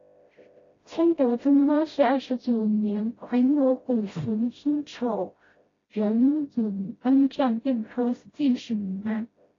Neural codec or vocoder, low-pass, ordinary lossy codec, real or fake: codec, 16 kHz, 0.5 kbps, FreqCodec, smaller model; 7.2 kHz; AAC, 32 kbps; fake